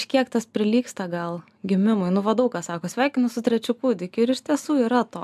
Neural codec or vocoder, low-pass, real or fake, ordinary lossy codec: none; 14.4 kHz; real; AAC, 96 kbps